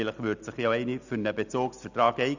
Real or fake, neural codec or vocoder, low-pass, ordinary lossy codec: real; none; 7.2 kHz; none